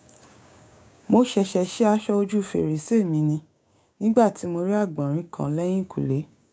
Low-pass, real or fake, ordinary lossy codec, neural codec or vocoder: none; fake; none; codec, 16 kHz, 6 kbps, DAC